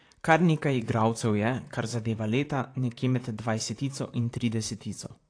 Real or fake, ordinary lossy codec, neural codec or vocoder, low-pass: fake; AAC, 48 kbps; vocoder, 44.1 kHz, 128 mel bands, Pupu-Vocoder; 9.9 kHz